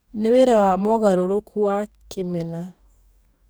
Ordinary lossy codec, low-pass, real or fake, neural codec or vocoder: none; none; fake; codec, 44.1 kHz, 2.6 kbps, DAC